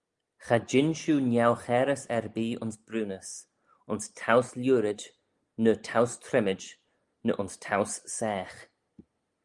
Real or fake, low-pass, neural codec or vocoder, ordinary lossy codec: real; 10.8 kHz; none; Opus, 32 kbps